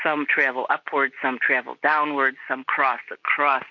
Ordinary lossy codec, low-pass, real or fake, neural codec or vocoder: MP3, 64 kbps; 7.2 kHz; real; none